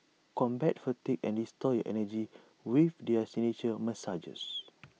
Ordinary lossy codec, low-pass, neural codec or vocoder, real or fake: none; none; none; real